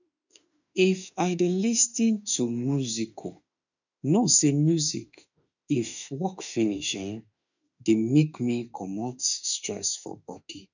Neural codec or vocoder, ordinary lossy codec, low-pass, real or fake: autoencoder, 48 kHz, 32 numbers a frame, DAC-VAE, trained on Japanese speech; none; 7.2 kHz; fake